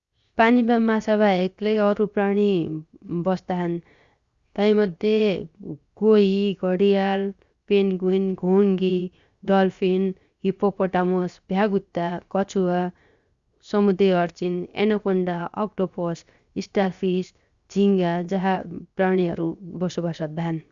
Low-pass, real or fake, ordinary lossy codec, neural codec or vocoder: 7.2 kHz; fake; Opus, 64 kbps; codec, 16 kHz, 0.7 kbps, FocalCodec